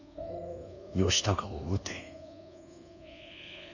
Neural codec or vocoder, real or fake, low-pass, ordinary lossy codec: codec, 24 kHz, 1.2 kbps, DualCodec; fake; 7.2 kHz; none